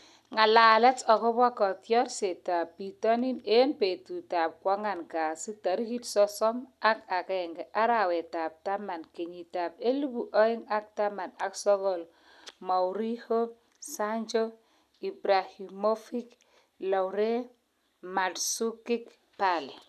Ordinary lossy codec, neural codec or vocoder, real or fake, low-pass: none; none; real; 14.4 kHz